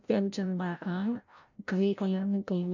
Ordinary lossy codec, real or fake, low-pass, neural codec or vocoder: none; fake; 7.2 kHz; codec, 16 kHz, 0.5 kbps, FreqCodec, larger model